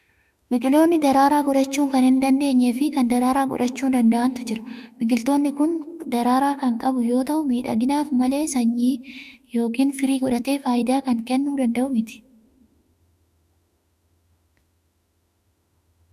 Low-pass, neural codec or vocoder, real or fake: 14.4 kHz; autoencoder, 48 kHz, 32 numbers a frame, DAC-VAE, trained on Japanese speech; fake